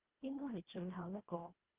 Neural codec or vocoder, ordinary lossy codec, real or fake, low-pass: codec, 24 kHz, 1.5 kbps, HILCodec; Opus, 16 kbps; fake; 3.6 kHz